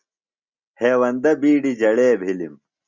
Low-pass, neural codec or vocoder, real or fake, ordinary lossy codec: 7.2 kHz; none; real; Opus, 64 kbps